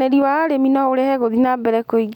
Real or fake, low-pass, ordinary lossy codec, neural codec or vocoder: real; 19.8 kHz; none; none